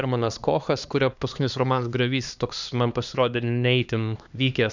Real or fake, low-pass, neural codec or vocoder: fake; 7.2 kHz; codec, 16 kHz, 2 kbps, X-Codec, HuBERT features, trained on LibriSpeech